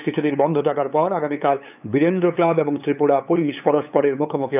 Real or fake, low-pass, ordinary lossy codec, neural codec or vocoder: fake; 3.6 kHz; none; codec, 16 kHz, 8 kbps, FunCodec, trained on LibriTTS, 25 frames a second